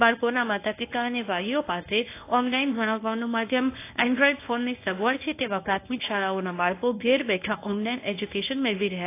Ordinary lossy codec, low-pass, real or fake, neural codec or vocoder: AAC, 24 kbps; 3.6 kHz; fake; codec, 24 kHz, 0.9 kbps, WavTokenizer, medium speech release version 1